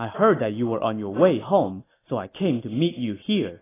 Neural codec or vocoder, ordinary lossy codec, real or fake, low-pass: none; AAC, 16 kbps; real; 3.6 kHz